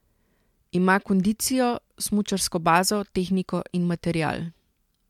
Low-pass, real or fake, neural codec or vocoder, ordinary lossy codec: 19.8 kHz; real; none; MP3, 96 kbps